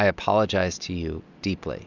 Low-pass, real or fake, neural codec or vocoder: 7.2 kHz; real; none